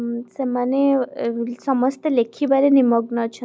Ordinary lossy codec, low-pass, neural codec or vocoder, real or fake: none; none; none; real